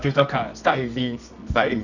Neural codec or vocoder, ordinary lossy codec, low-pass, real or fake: codec, 24 kHz, 0.9 kbps, WavTokenizer, medium music audio release; none; 7.2 kHz; fake